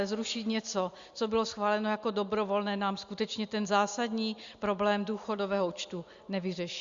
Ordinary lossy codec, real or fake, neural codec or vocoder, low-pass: Opus, 64 kbps; real; none; 7.2 kHz